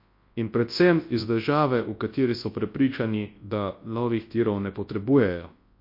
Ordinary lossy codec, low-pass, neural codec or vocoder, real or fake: MP3, 32 kbps; 5.4 kHz; codec, 24 kHz, 0.9 kbps, WavTokenizer, large speech release; fake